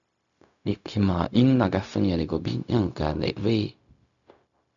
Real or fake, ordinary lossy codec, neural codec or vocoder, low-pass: fake; MP3, 96 kbps; codec, 16 kHz, 0.4 kbps, LongCat-Audio-Codec; 7.2 kHz